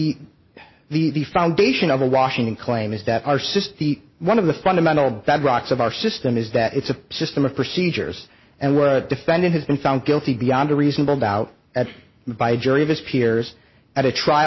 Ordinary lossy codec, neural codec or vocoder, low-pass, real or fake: MP3, 24 kbps; none; 7.2 kHz; real